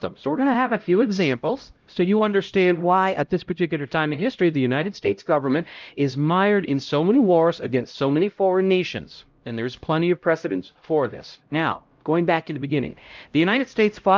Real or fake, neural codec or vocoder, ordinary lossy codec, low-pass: fake; codec, 16 kHz, 0.5 kbps, X-Codec, HuBERT features, trained on LibriSpeech; Opus, 24 kbps; 7.2 kHz